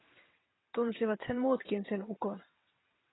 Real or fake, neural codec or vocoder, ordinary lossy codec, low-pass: fake; vocoder, 22.05 kHz, 80 mel bands, WaveNeXt; AAC, 16 kbps; 7.2 kHz